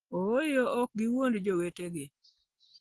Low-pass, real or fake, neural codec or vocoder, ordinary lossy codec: 10.8 kHz; real; none; Opus, 16 kbps